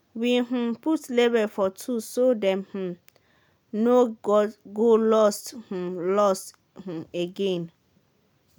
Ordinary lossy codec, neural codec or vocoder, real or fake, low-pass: none; none; real; none